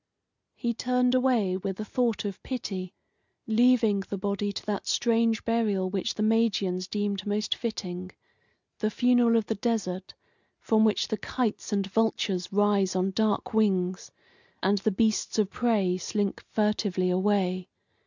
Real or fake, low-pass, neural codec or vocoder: real; 7.2 kHz; none